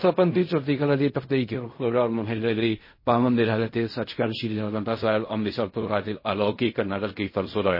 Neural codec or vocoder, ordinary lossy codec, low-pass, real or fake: codec, 16 kHz in and 24 kHz out, 0.4 kbps, LongCat-Audio-Codec, fine tuned four codebook decoder; MP3, 24 kbps; 5.4 kHz; fake